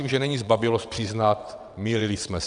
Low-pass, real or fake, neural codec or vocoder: 9.9 kHz; fake; vocoder, 22.05 kHz, 80 mel bands, WaveNeXt